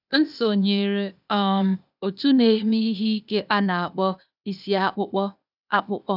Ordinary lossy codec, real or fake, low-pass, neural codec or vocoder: none; fake; 5.4 kHz; codec, 16 kHz, 0.8 kbps, ZipCodec